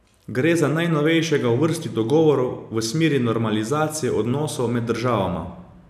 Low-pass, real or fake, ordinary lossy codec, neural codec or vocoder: 14.4 kHz; real; none; none